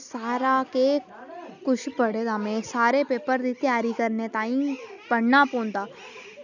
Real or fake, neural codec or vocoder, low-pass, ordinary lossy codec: real; none; 7.2 kHz; none